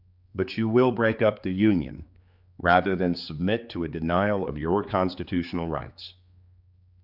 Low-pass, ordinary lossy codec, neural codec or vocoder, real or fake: 5.4 kHz; Opus, 64 kbps; codec, 16 kHz, 4 kbps, X-Codec, HuBERT features, trained on general audio; fake